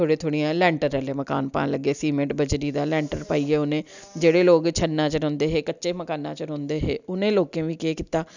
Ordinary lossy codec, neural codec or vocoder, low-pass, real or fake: none; none; 7.2 kHz; real